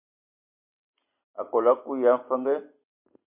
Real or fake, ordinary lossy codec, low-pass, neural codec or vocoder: real; MP3, 32 kbps; 3.6 kHz; none